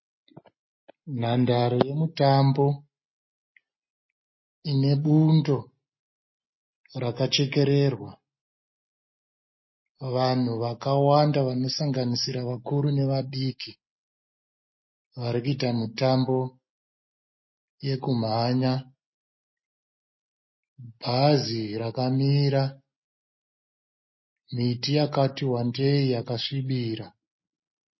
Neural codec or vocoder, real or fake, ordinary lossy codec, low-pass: none; real; MP3, 24 kbps; 7.2 kHz